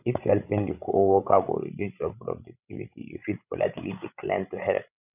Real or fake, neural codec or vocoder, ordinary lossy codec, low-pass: fake; codec, 16 kHz, 16 kbps, FreqCodec, larger model; none; 3.6 kHz